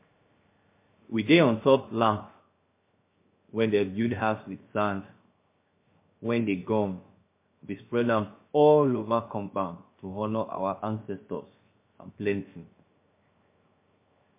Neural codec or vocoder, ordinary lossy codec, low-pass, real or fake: codec, 16 kHz, 0.7 kbps, FocalCodec; MP3, 24 kbps; 3.6 kHz; fake